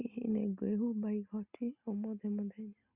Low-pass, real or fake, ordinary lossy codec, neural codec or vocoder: 3.6 kHz; real; Opus, 64 kbps; none